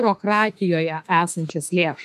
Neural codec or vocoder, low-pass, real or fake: autoencoder, 48 kHz, 32 numbers a frame, DAC-VAE, trained on Japanese speech; 14.4 kHz; fake